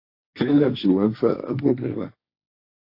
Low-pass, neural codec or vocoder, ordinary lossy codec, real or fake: 5.4 kHz; codec, 16 kHz, 1.1 kbps, Voila-Tokenizer; AAC, 32 kbps; fake